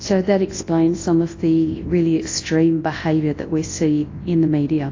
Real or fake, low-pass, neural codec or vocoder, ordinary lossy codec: fake; 7.2 kHz; codec, 24 kHz, 0.9 kbps, WavTokenizer, large speech release; AAC, 32 kbps